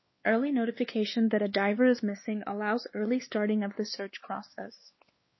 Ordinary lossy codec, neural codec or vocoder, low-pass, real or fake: MP3, 24 kbps; codec, 16 kHz, 2 kbps, X-Codec, WavLM features, trained on Multilingual LibriSpeech; 7.2 kHz; fake